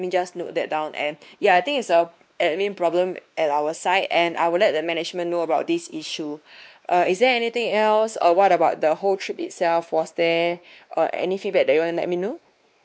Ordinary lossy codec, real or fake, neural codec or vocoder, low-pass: none; fake; codec, 16 kHz, 2 kbps, X-Codec, WavLM features, trained on Multilingual LibriSpeech; none